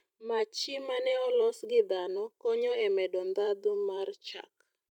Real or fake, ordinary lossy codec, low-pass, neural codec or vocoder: fake; none; 19.8 kHz; vocoder, 44.1 kHz, 128 mel bands every 512 samples, BigVGAN v2